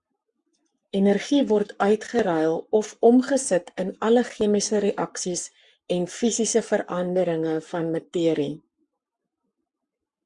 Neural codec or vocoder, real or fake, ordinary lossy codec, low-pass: codec, 44.1 kHz, 7.8 kbps, Pupu-Codec; fake; Opus, 64 kbps; 10.8 kHz